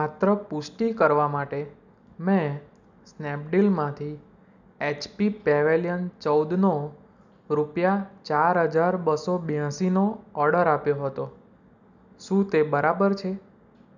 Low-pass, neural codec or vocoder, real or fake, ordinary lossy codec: 7.2 kHz; none; real; none